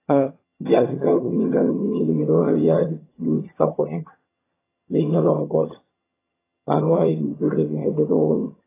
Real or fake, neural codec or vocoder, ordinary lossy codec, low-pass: fake; vocoder, 22.05 kHz, 80 mel bands, HiFi-GAN; AAC, 16 kbps; 3.6 kHz